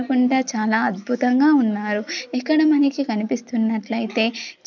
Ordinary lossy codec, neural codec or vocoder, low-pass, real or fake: none; none; 7.2 kHz; real